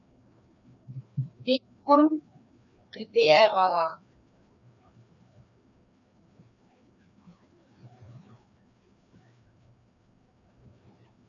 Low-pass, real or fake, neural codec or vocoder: 7.2 kHz; fake; codec, 16 kHz, 2 kbps, FreqCodec, larger model